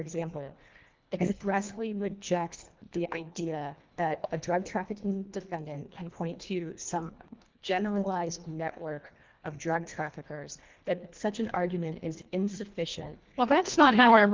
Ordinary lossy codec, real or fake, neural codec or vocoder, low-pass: Opus, 32 kbps; fake; codec, 24 kHz, 1.5 kbps, HILCodec; 7.2 kHz